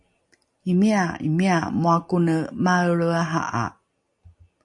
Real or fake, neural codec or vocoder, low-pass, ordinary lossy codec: real; none; 10.8 kHz; MP3, 48 kbps